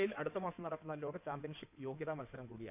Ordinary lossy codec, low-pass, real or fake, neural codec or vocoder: none; 3.6 kHz; fake; codec, 16 kHz in and 24 kHz out, 2.2 kbps, FireRedTTS-2 codec